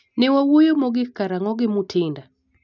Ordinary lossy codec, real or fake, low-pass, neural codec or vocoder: none; real; 7.2 kHz; none